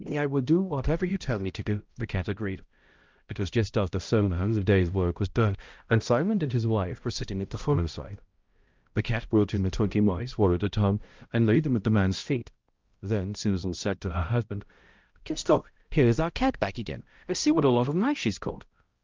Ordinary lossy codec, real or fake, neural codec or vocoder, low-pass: Opus, 24 kbps; fake; codec, 16 kHz, 0.5 kbps, X-Codec, HuBERT features, trained on balanced general audio; 7.2 kHz